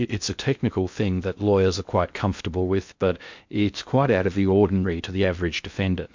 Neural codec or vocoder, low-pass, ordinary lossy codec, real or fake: codec, 16 kHz in and 24 kHz out, 0.6 kbps, FocalCodec, streaming, 2048 codes; 7.2 kHz; MP3, 64 kbps; fake